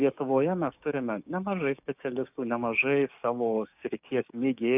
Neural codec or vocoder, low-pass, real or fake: codec, 16 kHz, 6 kbps, DAC; 3.6 kHz; fake